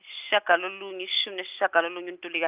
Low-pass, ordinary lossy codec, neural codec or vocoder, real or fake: 3.6 kHz; Opus, 24 kbps; none; real